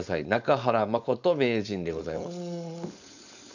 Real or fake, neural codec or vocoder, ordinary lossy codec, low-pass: fake; codec, 16 kHz, 4.8 kbps, FACodec; none; 7.2 kHz